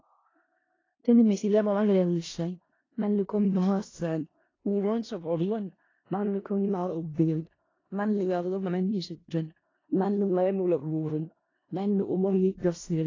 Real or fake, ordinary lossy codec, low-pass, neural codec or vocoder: fake; AAC, 32 kbps; 7.2 kHz; codec, 16 kHz in and 24 kHz out, 0.4 kbps, LongCat-Audio-Codec, four codebook decoder